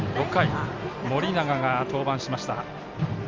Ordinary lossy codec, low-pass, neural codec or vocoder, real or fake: Opus, 32 kbps; 7.2 kHz; none; real